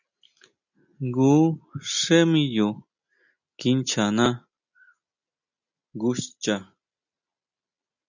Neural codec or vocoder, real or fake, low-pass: none; real; 7.2 kHz